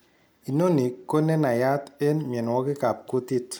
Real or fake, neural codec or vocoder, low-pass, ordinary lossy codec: real; none; none; none